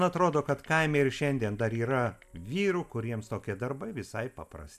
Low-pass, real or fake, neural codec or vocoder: 14.4 kHz; real; none